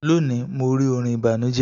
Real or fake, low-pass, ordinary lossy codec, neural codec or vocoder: real; 7.2 kHz; none; none